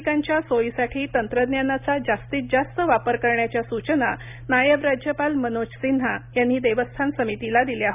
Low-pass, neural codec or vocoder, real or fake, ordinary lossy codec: 3.6 kHz; none; real; none